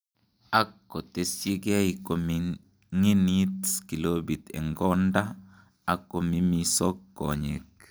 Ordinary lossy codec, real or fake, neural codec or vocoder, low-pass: none; real; none; none